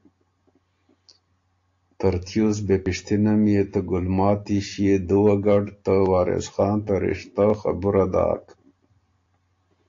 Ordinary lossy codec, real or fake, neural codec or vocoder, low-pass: AAC, 48 kbps; real; none; 7.2 kHz